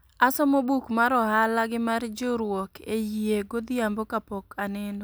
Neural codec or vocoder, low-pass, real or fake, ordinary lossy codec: none; none; real; none